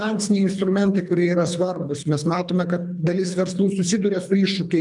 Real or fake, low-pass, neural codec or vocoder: fake; 10.8 kHz; codec, 24 kHz, 3 kbps, HILCodec